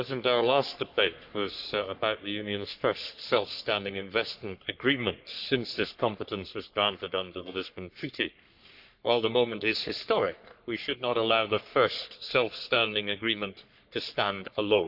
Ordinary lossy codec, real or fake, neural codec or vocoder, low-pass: none; fake; codec, 44.1 kHz, 3.4 kbps, Pupu-Codec; 5.4 kHz